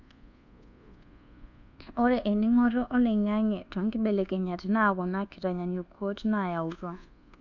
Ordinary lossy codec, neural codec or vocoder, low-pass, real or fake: none; codec, 24 kHz, 1.2 kbps, DualCodec; 7.2 kHz; fake